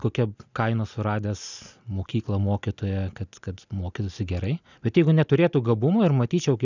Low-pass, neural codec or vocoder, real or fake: 7.2 kHz; none; real